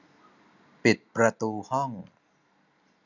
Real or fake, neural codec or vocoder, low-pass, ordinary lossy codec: real; none; 7.2 kHz; none